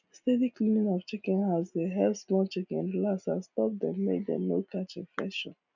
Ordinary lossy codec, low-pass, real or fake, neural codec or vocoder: none; 7.2 kHz; real; none